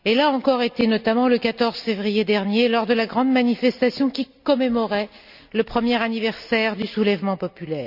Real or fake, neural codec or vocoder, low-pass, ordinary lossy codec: real; none; 5.4 kHz; none